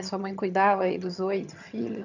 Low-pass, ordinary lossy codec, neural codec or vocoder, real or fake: 7.2 kHz; none; vocoder, 22.05 kHz, 80 mel bands, HiFi-GAN; fake